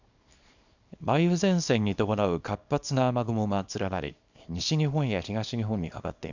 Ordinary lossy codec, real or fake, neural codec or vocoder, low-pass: none; fake; codec, 24 kHz, 0.9 kbps, WavTokenizer, small release; 7.2 kHz